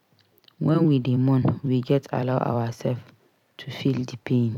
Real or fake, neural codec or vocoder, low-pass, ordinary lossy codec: fake; vocoder, 44.1 kHz, 128 mel bands every 256 samples, BigVGAN v2; 19.8 kHz; none